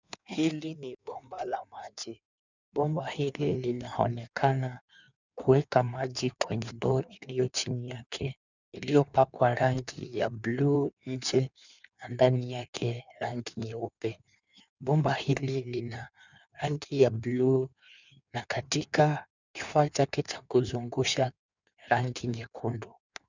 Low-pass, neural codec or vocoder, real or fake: 7.2 kHz; codec, 16 kHz in and 24 kHz out, 1.1 kbps, FireRedTTS-2 codec; fake